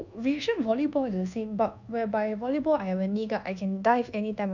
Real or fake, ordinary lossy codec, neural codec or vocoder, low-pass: fake; none; codec, 24 kHz, 1.2 kbps, DualCodec; 7.2 kHz